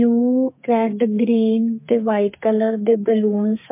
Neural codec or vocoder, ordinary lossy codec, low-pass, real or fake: codec, 32 kHz, 1.9 kbps, SNAC; MP3, 24 kbps; 3.6 kHz; fake